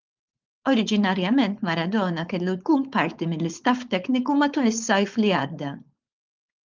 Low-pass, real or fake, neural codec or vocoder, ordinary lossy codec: 7.2 kHz; fake; codec, 16 kHz, 4.8 kbps, FACodec; Opus, 32 kbps